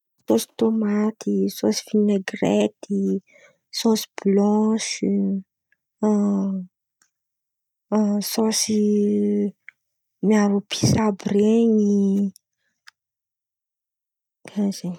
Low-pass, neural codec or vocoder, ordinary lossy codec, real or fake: 19.8 kHz; none; none; real